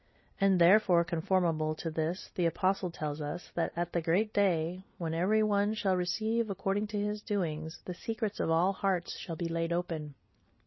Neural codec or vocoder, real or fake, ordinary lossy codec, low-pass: none; real; MP3, 24 kbps; 7.2 kHz